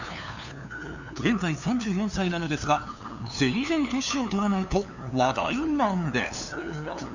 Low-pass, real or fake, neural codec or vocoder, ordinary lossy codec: 7.2 kHz; fake; codec, 16 kHz, 2 kbps, FunCodec, trained on LibriTTS, 25 frames a second; none